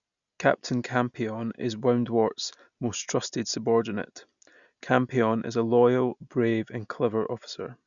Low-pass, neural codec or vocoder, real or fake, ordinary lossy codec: 7.2 kHz; none; real; none